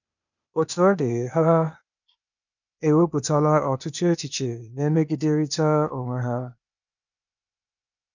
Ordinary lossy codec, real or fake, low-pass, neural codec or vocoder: none; fake; 7.2 kHz; codec, 16 kHz, 0.8 kbps, ZipCodec